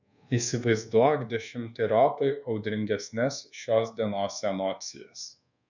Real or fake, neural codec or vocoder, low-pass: fake; codec, 24 kHz, 1.2 kbps, DualCodec; 7.2 kHz